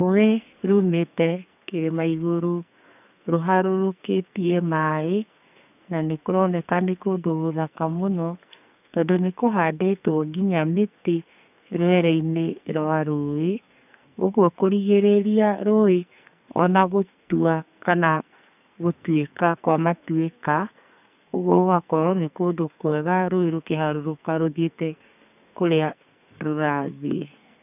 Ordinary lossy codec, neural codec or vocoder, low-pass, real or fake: none; codec, 44.1 kHz, 2.6 kbps, SNAC; 3.6 kHz; fake